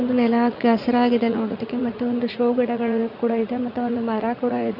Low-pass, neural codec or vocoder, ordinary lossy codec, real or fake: 5.4 kHz; vocoder, 44.1 kHz, 80 mel bands, Vocos; none; fake